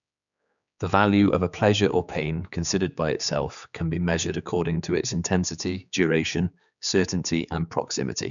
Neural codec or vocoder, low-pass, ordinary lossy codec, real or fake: codec, 16 kHz, 4 kbps, X-Codec, HuBERT features, trained on general audio; 7.2 kHz; none; fake